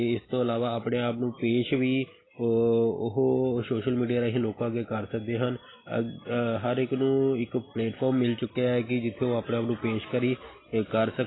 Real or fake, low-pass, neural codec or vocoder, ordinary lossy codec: real; 7.2 kHz; none; AAC, 16 kbps